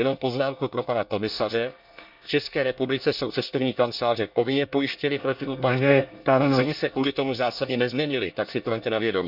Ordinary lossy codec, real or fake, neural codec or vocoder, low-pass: none; fake; codec, 24 kHz, 1 kbps, SNAC; 5.4 kHz